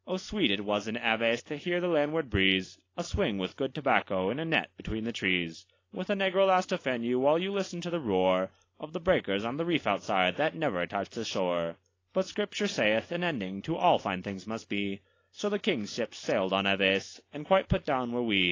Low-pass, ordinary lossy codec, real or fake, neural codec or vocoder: 7.2 kHz; AAC, 32 kbps; real; none